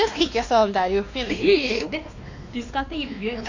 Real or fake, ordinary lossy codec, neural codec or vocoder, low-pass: fake; AAC, 48 kbps; codec, 16 kHz, 2 kbps, X-Codec, WavLM features, trained on Multilingual LibriSpeech; 7.2 kHz